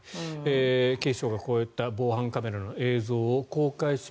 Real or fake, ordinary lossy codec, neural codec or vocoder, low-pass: real; none; none; none